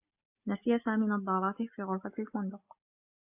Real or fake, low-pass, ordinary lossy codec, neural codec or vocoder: real; 3.6 kHz; AAC, 32 kbps; none